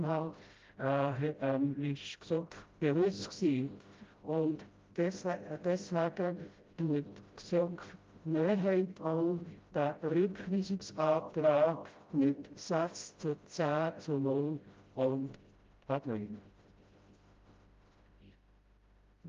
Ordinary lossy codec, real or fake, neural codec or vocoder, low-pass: Opus, 32 kbps; fake; codec, 16 kHz, 0.5 kbps, FreqCodec, smaller model; 7.2 kHz